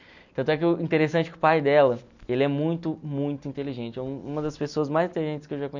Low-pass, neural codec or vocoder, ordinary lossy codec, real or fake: 7.2 kHz; none; none; real